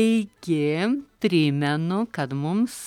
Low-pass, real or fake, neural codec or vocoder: 19.8 kHz; real; none